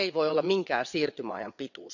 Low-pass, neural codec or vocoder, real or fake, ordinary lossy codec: 7.2 kHz; vocoder, 22.05 kHz, 80 mel bands, WaveNeXt; fake; none